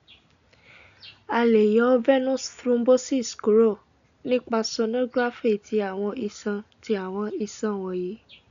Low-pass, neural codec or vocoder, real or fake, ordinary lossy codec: 7.2 kHz; none; real; none